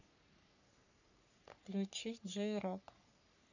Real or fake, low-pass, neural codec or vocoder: fake; 7.2 kHz; codec, 44.1 kHz, 3.4 kbps, Pupu-Codec